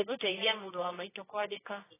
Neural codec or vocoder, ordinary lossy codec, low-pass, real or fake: codec, 24 kHz, 0.9 kbps, WavTokenizer, medium music audio release; AAC, 16 kbps; 3.6 kHz; fake